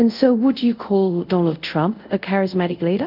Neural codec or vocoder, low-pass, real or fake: codec, 24 kHz, 0.5 kbps, DualCodec; 5.4 kHz; fake